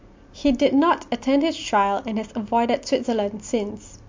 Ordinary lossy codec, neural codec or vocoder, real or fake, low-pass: MP3, 48 kbps; none; real; 7.2 kHz